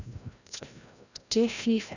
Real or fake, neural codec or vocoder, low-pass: fake; codec, 16 kHz, 0.5 kbps, FreqCodec, larger model; 7.2 kHz